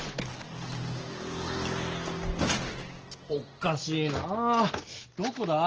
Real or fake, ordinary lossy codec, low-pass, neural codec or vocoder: real; Opus, 16 kbps; 7.2 kHz; none